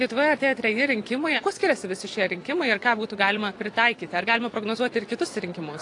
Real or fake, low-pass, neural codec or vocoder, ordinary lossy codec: fake; 10.8 kHz; vocoder, 24 kHz, 100 mel bands, Vocos; AAC, 48 kbps